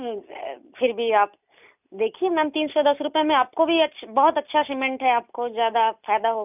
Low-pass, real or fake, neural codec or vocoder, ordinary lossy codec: 3.6 kHz; real; none; none